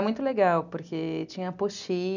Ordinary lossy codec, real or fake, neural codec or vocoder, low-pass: none; real; none; 7.2 kHz